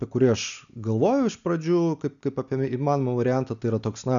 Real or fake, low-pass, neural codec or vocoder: real; 7.2 kHz; none